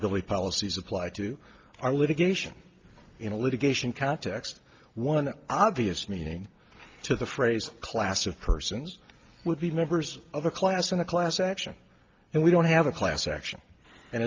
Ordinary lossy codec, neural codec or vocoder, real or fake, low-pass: Opus, 32 kbps; none; real; 7.2 kHz